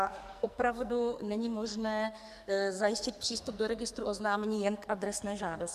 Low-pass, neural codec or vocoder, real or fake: 14.4 kHz; codec, 44.1 kHz, 2.6 kbps, SNAC; fake